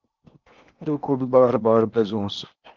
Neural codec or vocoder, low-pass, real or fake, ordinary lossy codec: codec, 16 kHz in and 24 kHz out, 0.8 kbps, FocalCodec, streaming, 65536 codes; 7.2 kHz; fake; Opus, 24 kbps